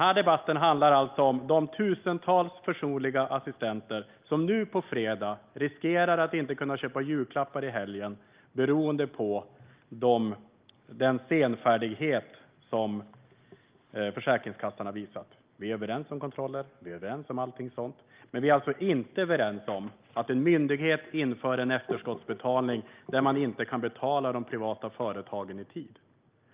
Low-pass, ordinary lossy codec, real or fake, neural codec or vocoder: 3.6 kHz; Opus, 24 kbps; real; none